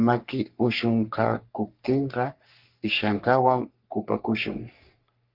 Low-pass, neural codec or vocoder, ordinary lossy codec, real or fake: 5.4 kHz; codec, 44.1 kHz, 3.4 kbps, Pupu-Codec; Opus, 24 kbps; fake